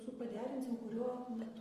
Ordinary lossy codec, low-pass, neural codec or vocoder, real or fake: Opus, 32 kbps; 14.4 kHz; none; real